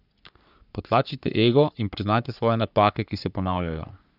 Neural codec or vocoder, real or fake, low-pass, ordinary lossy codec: codec, 44.1 kHz, 3.4 kbps, Pupu-Codec; fake; 5.4 kHz; none